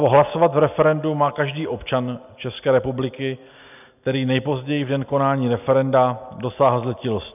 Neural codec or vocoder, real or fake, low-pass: none; real; 3.6 kHz